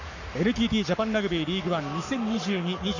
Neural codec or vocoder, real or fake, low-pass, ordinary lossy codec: codec, 44.1 kHz, 7.8 kbps, Pupu-Codec; fake; 7.2 kHz; none